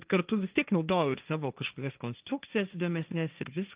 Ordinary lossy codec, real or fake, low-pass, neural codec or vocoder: Opus, 24 kbps; fake; 3.6 kHz; codec, 16 kHz, 1.1 kbps, Voila-Tokenizer